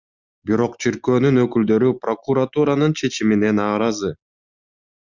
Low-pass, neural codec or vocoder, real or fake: 7.2 kHz; none; real